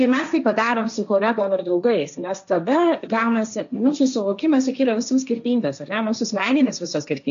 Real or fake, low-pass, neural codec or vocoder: fake; 7.2 kHz; codec, 16 kHz, 1.1 kbps, Voila-Tokenizer